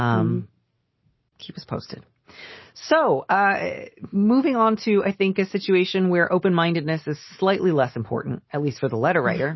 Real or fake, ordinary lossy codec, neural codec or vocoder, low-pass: real; MP3, 24 kbps; none; 7.2 kHz